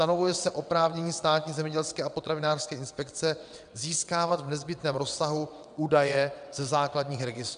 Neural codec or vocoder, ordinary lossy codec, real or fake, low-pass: vocoder, 22.05 kHz, 80 mel bands, WaveNeXt; AAC, 96 kbps; fake; 9.9 kHz